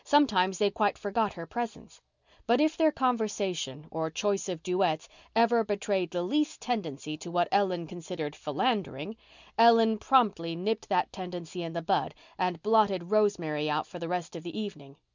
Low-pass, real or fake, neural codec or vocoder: 7.2 kHz; real; none